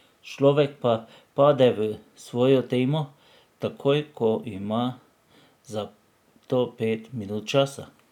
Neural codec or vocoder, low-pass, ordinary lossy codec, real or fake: none; 19.8 kHz; none; real